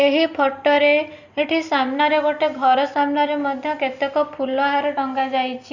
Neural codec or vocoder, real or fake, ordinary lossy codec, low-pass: none; real; Opus, 64 kbps; 7.2 kHz